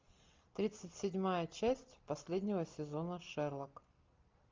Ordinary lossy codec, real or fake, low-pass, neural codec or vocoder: Opus, 24 kbps; real; 7.2 kHz; none